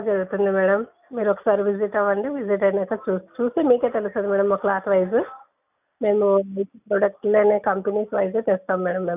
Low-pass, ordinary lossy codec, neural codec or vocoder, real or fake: 3.6 kHz; none; none; real